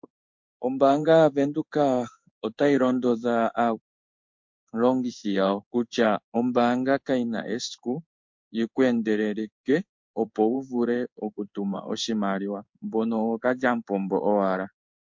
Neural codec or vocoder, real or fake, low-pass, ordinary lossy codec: codec, 16 kHz in and 24 kHz out, 1 kbps, XY-Tokenizer; fake; 7.2 kHz; MP3, 48 kbps